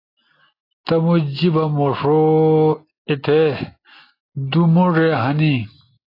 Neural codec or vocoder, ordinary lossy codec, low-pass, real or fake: none; AAC, 24 kbps; 5.4 kHz; real